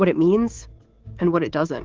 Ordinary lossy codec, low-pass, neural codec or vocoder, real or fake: Opus, 16 kbps; 7.2 kHz; codec, 24 kHz, 3.1 kbps, DualCodec; fake